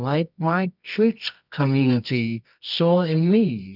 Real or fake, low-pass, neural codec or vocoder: fake; 5.4 kHz; codec, 24 kHz, 0.9 kbps, WavTokenizer, medium music audio release